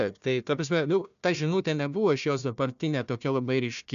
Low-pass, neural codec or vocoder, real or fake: 7.2 kHz; codec, 16 kHz, 1 kbps, FunCodec, trained on Chinese and English, 50 frames a second; fake